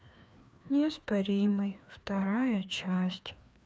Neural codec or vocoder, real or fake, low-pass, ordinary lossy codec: codec, 16 kHz, 2 kbps, FreqCodec, larger model; fake; none; none